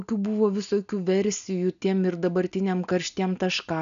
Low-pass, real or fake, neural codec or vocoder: 7.2 kHz; real; none